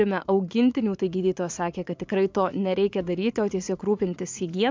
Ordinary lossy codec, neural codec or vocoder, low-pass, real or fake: MP3, 64 kbps; vocoder, 44.1 kHz, 80 mel bands, Vocos; 7.2 kHz; fake